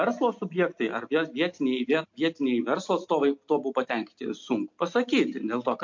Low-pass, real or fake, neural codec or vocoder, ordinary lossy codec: 7.2 kHz; real; none; MP3, 48 kbps